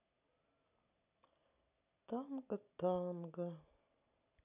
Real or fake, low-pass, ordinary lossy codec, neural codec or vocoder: real; 3.6 kHz; none; none